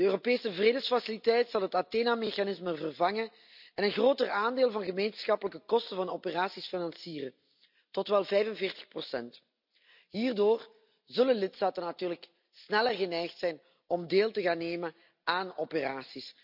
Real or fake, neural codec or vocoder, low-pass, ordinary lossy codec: real; none; 5.4 kHz; none